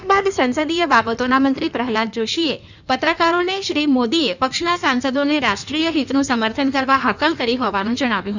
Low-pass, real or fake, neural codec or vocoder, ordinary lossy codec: 7.2 kHz; fake; codec, 16 kHz in and 24 kHz out, 1.1 kbps, FireRedTTS-2 codec; none